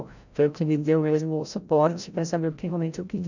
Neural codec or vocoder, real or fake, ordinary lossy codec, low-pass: codec, 16 kHz, 0.5 kbps, FreqCodec, larger model; fake; none; 7.2 kHz